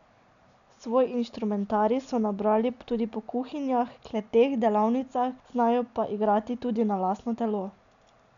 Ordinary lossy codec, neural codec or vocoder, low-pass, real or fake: none; none; 7.2 kHz; real